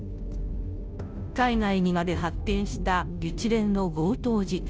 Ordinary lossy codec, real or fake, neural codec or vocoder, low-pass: none; fake; codec, 16 kHz, 0.5 kbps, FunCodec, trained on Chinese and English, 25 frames a second; none